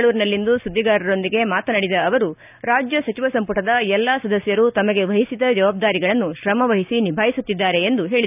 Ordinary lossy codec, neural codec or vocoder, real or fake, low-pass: none; none; real; 3.6 kHz